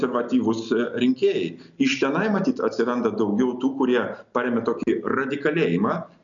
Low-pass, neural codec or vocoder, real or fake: 7.2 kHz; none; real